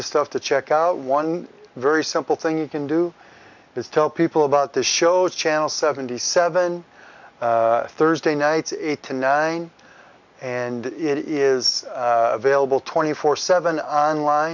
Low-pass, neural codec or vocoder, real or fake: 7.2 kHz; none; real